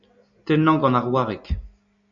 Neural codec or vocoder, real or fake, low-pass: none; real; 7.2 kHz